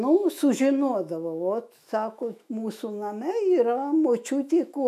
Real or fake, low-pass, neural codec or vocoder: fake; 14.4 kHz; autoencoder, 48 kHz, 128 numbers a frame, DAC-VAE, trained on Japanese speech